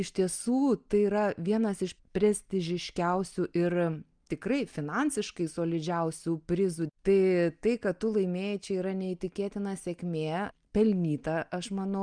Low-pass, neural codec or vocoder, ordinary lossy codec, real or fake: 9.9 kHz; none; Opus, 32 kbps; real